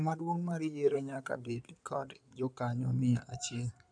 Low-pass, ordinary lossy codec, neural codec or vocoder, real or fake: 9.9 kHz; none; codec, 16 kHz in and 24 kHz out, 2.2 kbps, FireRedTTS-2 codec; fake